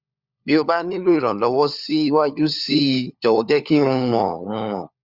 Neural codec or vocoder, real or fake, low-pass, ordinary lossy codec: codec, 16 kHz, 4 kbps, FunCodec, trained on LibriTTS, 50 frames a second; fake; 5.4 kHz; Opus, 64 kbps